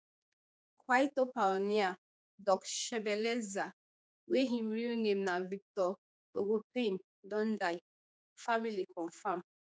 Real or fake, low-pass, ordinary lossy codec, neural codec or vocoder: fake; none; none; codec, 16 kHz, 4 kbps, X-Codec, HuBERT features, trained on general audio